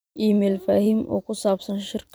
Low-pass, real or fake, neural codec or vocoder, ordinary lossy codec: none; fake; vocoder, 44.1 kHz, 128 mel bands every 512 samples, BigVGAN v2; none